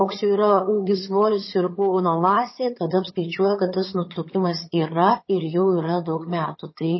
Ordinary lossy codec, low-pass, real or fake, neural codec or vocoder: MP3, 24 kbps; 7.2 kHz; fake; vocoder, 22.05 kHz, 80 mel bands, HiFi-GAN